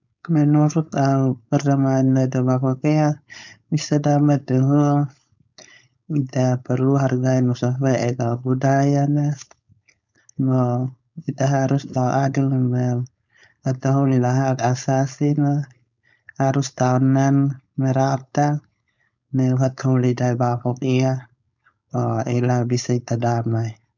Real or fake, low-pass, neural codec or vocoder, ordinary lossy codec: fake; 7.2 kHz; codec, 16 kHz, 4.8 kbps, FACodec; none